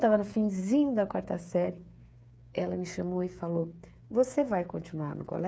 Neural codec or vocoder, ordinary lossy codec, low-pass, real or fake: codec, 16 kHz, 8 kbps, FreqCodec, smaller model; none; none; fake